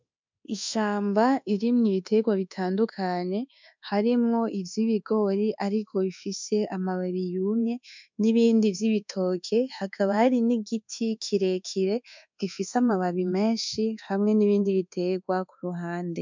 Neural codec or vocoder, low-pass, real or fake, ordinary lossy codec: codec, 24 kHz, 1.2 kbps, DualCodec; 7.2 kHz; fake; MP3, 64 kbps